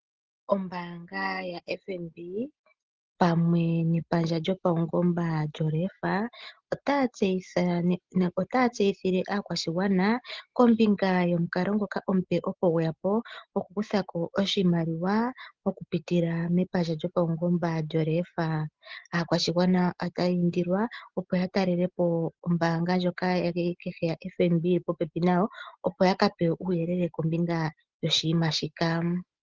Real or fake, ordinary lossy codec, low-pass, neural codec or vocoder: real; Opus, 16 kbps; 7.2 kHz; none